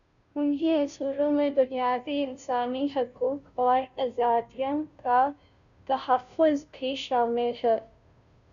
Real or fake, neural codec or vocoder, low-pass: fake; codec, 16 kHz, 0.5 kbps, FunCodec, trained on Chinese and English, 25 frames a second; 7.2 kHz